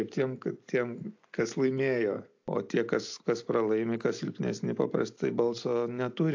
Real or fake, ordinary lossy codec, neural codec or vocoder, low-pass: real; AAC, 48 kbps; none; 7.2 kHz